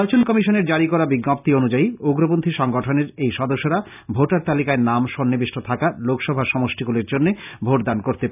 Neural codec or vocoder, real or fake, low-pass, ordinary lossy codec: none; real; 3.6 kHz; none